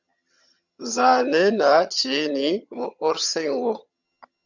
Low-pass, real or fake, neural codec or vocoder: 7.2 kHz; fake; vocoder, 22.05 kHz, 80 mel bands, HiFi-GAN